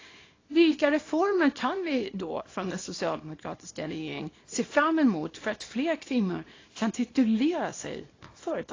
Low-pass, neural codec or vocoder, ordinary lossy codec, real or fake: 7.2 kHz; codec, 24 kHz, 0.9 kbps, WavTokenizer, small release; AAC, 32 kbps; fake